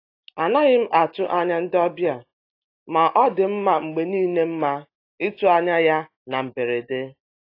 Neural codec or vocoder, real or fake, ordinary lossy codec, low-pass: none; real; AAC, 32 kbps; 5.4 kHz